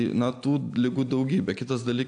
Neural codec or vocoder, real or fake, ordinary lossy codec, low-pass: none; real; AAC, 64 kbps; 9.9 kHz